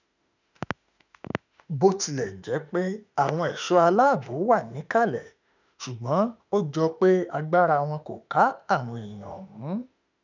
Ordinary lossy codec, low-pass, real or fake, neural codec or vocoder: none; 7.2 kHz; fake; autoencoder, 48 kHz, 32 numbers a frame, DAC-VAE, trained on Japanese speech